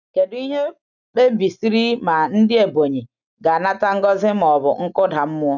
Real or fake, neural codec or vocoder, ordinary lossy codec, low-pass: real; none; none; 7.2 kHz